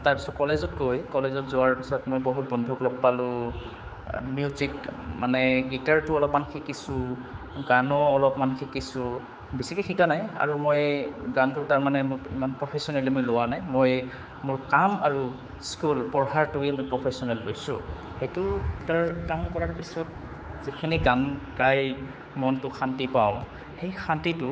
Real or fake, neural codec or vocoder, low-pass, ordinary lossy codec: fake; codec, 16 kHz, 4 kbps, X-Codec, HuBERT features, trained on general audio; none; none